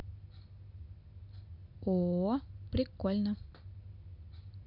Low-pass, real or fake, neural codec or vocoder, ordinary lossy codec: 5.4 kHz; real; none; none